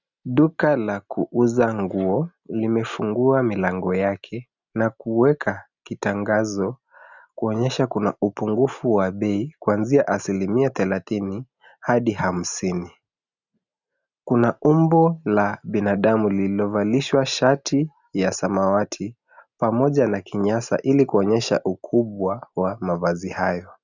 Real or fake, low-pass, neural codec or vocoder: real; 7.2 kHz; none